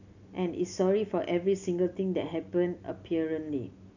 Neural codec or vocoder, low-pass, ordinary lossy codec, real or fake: none; 7.2 kHz; none; real